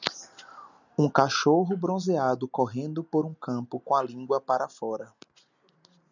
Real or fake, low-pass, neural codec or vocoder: real; 7.2 kHz; none